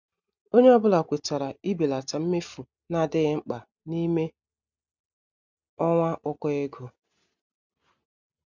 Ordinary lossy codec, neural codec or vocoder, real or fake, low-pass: none; none; real; 7.2 kHz